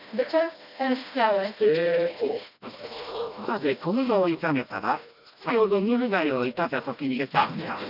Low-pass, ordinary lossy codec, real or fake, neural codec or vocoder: 5.4 kHz; none; fake; codec, 16 kHz, 1 kbps, FreqCodec, smaller model